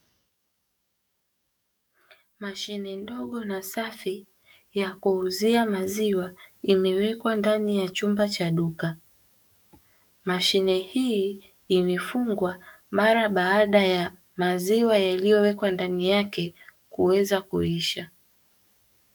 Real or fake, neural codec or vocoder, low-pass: fake; codec, 44.1 kHz, 7.8 kbps, DAC; 19.8 kHz